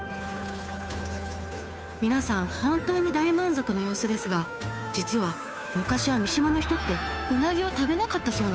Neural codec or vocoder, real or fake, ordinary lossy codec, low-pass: codec, 16 kHz, 2 kbps, FunCodec, trained on Chinese and English, 25 frames a second; fake; none; none